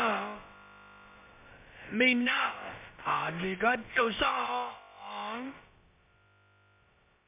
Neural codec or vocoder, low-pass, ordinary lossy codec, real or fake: codec, 16 kHz, about 1 kbps, DyCAST, with the encoder's durations; 3.6 kHz; MP3, 32 kbps; fake